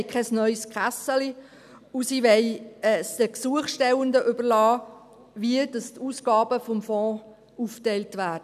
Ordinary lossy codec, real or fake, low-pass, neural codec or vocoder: none; real; 14.4 kHz; none